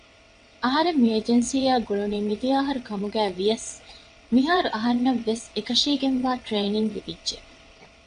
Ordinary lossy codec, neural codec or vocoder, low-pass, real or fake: AAC, 96 kbps; vocoder, 22.05 kHz, 80 mel bands, WaveNeXt; 9.9 kHz; fake